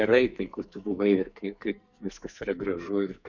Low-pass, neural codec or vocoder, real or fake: 7.2 kHz; codec, 44.1 kHz, 2.6 kbps, SNAC; fake